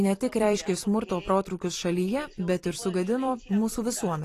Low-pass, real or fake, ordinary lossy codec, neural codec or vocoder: 14.4 kHz; fake; AAC, 48 kbps; vocoder, 44.1 kHz, 128 mel bands every 256 samples, BigVGAN v2